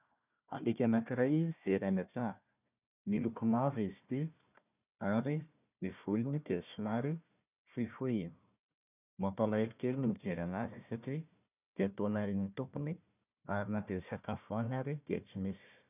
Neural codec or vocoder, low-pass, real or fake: codec, 16 kHz, 1 kbps, FunCodec, trained on Chinese and English, 50 frames a second; 3.6 kHz; fake